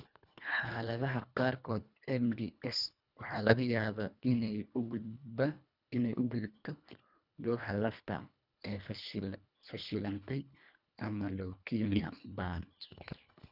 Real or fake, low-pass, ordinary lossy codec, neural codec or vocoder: fake; 5.4 kHz; none; codec, 24 kHz, 1.5 kbps, HILCodec